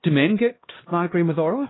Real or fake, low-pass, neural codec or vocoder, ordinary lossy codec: fake; 7.2 kHz; codec, 16 kHz, 1 kbps, X-Codec, HuBERT features, trained on LibriSpeech; AAC, 16 kbps